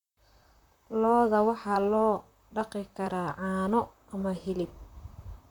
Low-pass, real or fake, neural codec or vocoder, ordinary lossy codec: 19.8 kHz; fake; vocoder, 44.1 kHz, 128 mel bands, Pupu-Vocoder; none